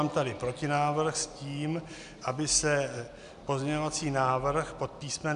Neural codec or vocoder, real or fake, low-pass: none; real; 10.8 kHz